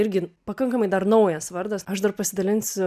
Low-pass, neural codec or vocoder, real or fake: 14.4 kHz; none; real